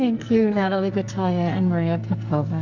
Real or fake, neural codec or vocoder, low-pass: fake; codec, 44.1 kHz, 2.6 kbps, SNAC; 7.2 kHz